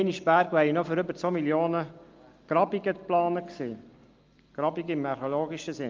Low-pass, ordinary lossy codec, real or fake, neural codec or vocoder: 7.2 kHz; Opus, 24 kbps; real; none